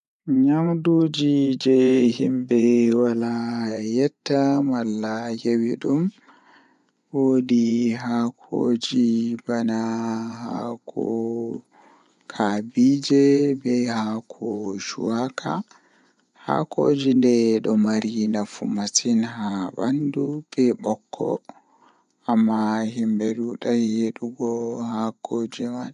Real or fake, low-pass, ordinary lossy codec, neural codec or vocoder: fake; 10.8 kHz; none; vocoder, 24 kHz, 100 mel bands, Vocos